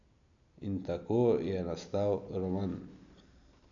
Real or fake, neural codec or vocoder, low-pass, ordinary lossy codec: real; none; 7.2 kHz; none